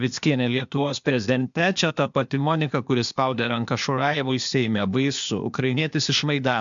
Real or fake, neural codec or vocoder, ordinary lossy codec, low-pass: fake; codec, 16 kHz, 0.8 kbps, ZipCodec; MP3, 64 kbps; 7.2 kHz